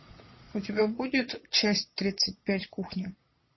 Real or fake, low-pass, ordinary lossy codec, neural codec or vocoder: fake; 7.2 kHz; MP3, 24 kbps; vocoder, 22.05 kHz, 80 mel bands, Vocos